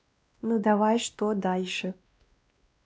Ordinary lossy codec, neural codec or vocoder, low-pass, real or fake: none; codec, 16 kHz, 1 kbps, X-Codec, WavLM features, trained on Multilingual LibriSpeech; none; fake